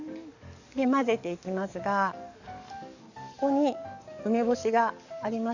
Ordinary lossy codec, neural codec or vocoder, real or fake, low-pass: none; codec, 44.1 kHz, 7.8 kbps, DAC; fake; 7.2 kHz